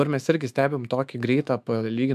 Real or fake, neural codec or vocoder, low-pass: fake; autoencoder, 48 kHz, 128 numbers a frame, DAC-VAE, trained on Japanese speech; 14.4 kHz